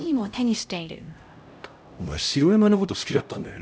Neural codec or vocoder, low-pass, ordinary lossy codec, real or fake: codec, 16 kHz, 0.5 kbps, X-Codec, HuBERT features, trained on LibriSpeech; none; none; fake